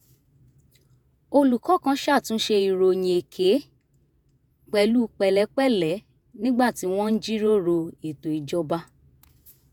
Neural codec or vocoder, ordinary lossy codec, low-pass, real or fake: vocoder, 48 kHz, 128 mel bands, Vocos; none; none; fake